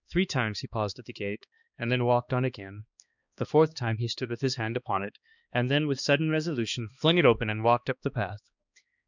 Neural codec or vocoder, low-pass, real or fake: codec, 16 kHz, 2 kbps, X-Codec, HuBERT features, trained on balanced general audio; 7.2 kHz; fake